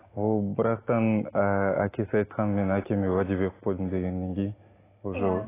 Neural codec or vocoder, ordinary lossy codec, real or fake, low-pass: none; AAC, 16 kbps; real; 3.6 kHz